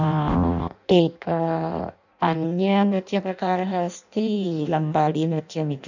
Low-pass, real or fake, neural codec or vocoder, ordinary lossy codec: 7.2 kHz; fake; codec, 16 kHz in and 24 kHz out, 0.6 kbps, FireRedTTS-2 codec; none